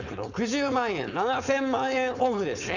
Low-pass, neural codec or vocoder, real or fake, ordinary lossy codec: 7.2 kHz; codec, 16 kHz, 4.8 kbps, FACodec; fake; none